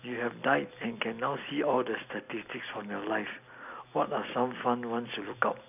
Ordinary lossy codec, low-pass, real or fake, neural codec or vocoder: none; 3.6 kHz; real; none